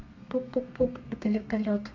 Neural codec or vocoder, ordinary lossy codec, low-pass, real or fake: codec, 32 kHz, 1.9 kbps, SNAC; MP3, 64 kbps; 7.2 kHz; fake